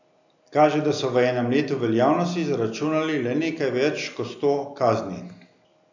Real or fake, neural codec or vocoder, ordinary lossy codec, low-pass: real; none; none; 7.2 kHz